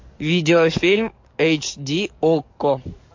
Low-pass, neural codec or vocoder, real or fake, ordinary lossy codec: 7.2 kHz; codec, 44.1 kHz, 7.8 kbps, DAC; fake; MP3, 48 kbps